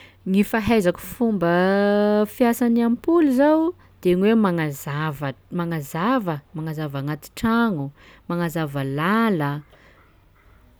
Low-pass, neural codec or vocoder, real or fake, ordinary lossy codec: none; none; real; none